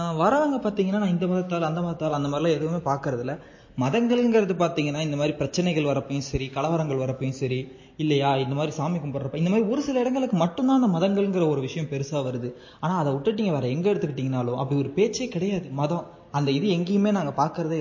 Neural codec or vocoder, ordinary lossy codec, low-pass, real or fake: none; MP3, 32 kbps; 7.2 kHz; real